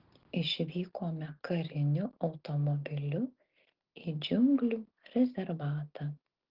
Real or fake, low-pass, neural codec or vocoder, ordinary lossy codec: real; 5.4 kHz; none; Opus, 16 kbps